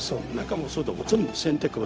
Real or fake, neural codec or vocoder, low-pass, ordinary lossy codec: fake; codec, 16 kHz, 0.4 kbps, LongCat-Audio-Codec; none; none